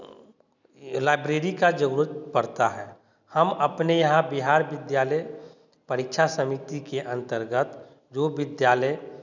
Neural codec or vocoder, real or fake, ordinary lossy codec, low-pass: none; real; none; 7.2 kHz